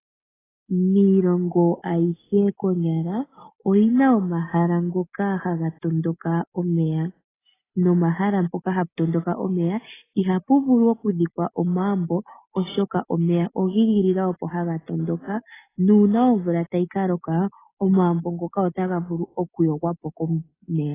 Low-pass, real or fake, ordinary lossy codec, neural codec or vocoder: 3.6 kHz; real; AAC, 16 kbps; none